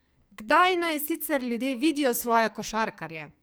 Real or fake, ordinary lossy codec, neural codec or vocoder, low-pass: fake; none; codec, 44.1 kHz, 2.6 kbps, SNAC; none